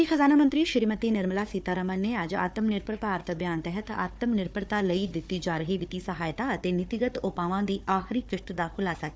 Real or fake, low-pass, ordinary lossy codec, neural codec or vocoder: fake; none; none; codec, 16 kHz, 4 kbps, FunCodec, trained on Chinese and English, 50 frames a second